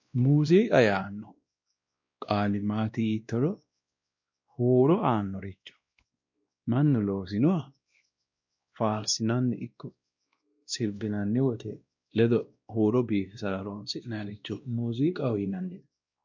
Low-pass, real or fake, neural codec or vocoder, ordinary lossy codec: 7.2 kHz; fake; codec, 16 kHz, 1 kbps, X-Codec, WavLM features, trained on Multilingual LibriSpeech; MP3, 64 kbps